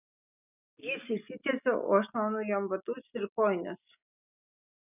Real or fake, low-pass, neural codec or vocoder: real; 3.6 kHz; none